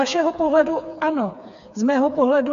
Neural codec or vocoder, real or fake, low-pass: codec, 16 kHz, 4 kbps, FreqCodec, smaller model; fake; 7.2 kHz